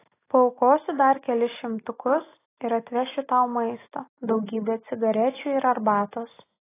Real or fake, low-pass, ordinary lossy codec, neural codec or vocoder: real; 3.6 kHz; AAC, 16 kbps; none